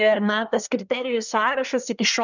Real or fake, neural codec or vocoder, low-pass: fake; codec, 24 kHz, 1 kbps, SNAC; 7.2 kHz